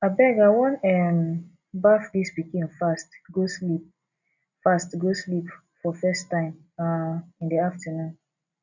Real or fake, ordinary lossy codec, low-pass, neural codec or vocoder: real; none; 7.2 kHz; none